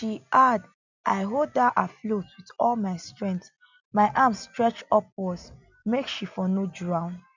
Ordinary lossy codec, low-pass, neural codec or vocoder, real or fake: none; 7.2 kHz; none; real